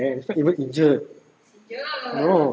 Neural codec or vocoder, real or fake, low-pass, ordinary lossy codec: none; real; none; none